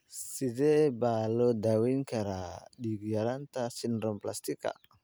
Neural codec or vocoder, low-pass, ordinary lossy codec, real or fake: none; none; none; real